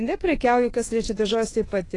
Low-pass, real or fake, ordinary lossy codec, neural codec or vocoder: 10.8 kHz; fake; AAC, 32 kbps; codec, 24 kHz, 1.2 kbps, DualCodec